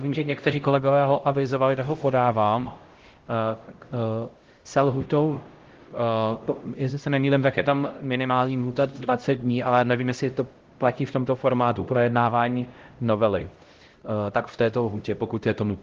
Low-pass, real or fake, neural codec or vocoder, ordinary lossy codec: 7.2 kHz; fake; codec, 16 kHz, 0.5 kbps, X-Codec, HuBERT features, trained on LibriSpeech; Opus, 16 kbps